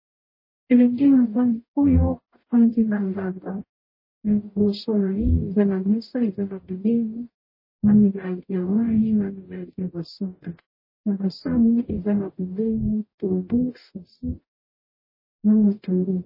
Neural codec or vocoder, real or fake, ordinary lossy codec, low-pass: codec, 44.1 kHz, 0.9 kbps, DAC; fake; MP3, 24 kbps; 5.4 kHz